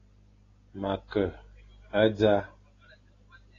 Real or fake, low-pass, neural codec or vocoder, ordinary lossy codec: real; 7.2 kHz; none; AAC, 32 kbps